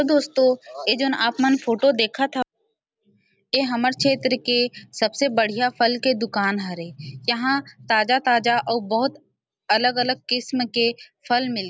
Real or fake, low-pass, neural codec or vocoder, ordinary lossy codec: real; none; none; none